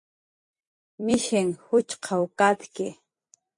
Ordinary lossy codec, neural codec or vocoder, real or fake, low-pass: MP3, 48 kbps; vocoder, 44.1 kHz, 128 mel bands every 256 samples, BigVGAN v2; fake; 10.8 kHz